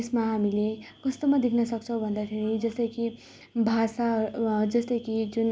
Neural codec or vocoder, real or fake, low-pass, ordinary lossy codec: none; real; none; none